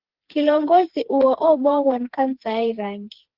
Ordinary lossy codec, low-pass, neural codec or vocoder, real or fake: Opus, 16 kbps; 5.4 kHz; codec, 16 kHz, 4 kbps, FreqCodec, smaller model; fake